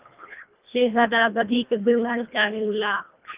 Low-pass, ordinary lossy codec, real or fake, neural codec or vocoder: 3.6 kHz; Opus, 24 kbps; fake; codec, 24 kHz, 1.5 kbps, HILCodec